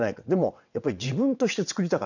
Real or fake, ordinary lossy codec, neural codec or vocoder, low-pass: fake; none; vocoder, 22.05 kHz, 80 mel bands, WaveNeXt; 7.2 kHz